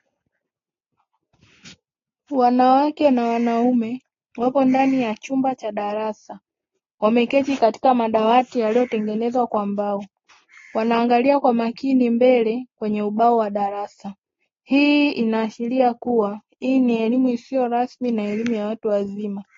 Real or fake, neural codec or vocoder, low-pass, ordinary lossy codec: real; none; 7.2 kHz; AAC, 32 kbps